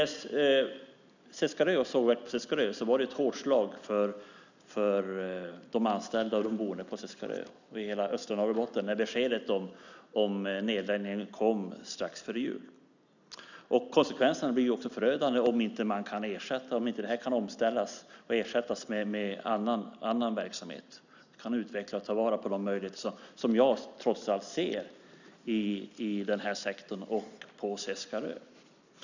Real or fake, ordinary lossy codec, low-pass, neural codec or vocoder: real; none; 7.2 kHz; none